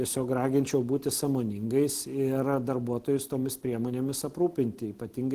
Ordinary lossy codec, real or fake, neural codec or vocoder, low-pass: Opus, 16 kbps; real; none; 14.4 kHz